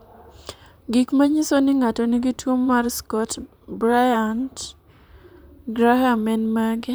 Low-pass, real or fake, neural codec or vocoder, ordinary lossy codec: none; fake; vocoder, 44.1 kHz, 128 mel bands, Pupu-Vocoder; none